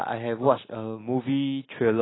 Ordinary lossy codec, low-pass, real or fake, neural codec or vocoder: AAC, 16 kbps; 7.2 kHz; real; none